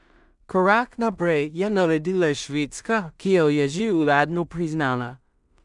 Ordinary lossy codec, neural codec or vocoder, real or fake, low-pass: none; codec, 16 kHz in and 24 kHz out, 0.4 kbps, LongCat-Audio-Codec, two codebook decoder; fake; 10.8 kHz